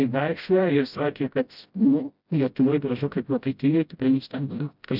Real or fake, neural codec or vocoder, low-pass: fake; codec, 16 kHz, 0.5 kbps, FreqCodec, smaller model; 5.4 kHz